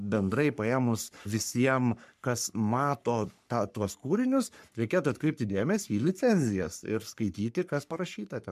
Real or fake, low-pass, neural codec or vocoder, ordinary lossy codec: fake; 14.4 kHz; codec, 44.1 kHz, 3.4 kbps, Pupu-Codec; MP3, 96 kbps